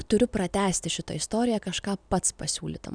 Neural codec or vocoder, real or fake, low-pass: none; real; 9.9 kHz